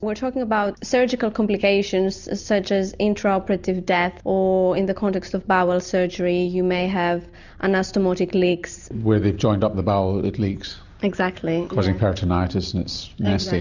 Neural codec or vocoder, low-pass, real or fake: none; 7.2 kHz; real